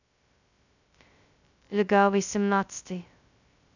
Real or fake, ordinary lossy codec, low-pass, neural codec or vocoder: fake; none; 7.2 kHz; codec, 16 kHz, 0.2 kbps, FocalCodec